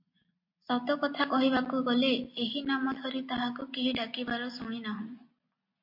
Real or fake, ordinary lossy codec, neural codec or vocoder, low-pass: real; MP3, 32 kbps; none; 5.4 kHz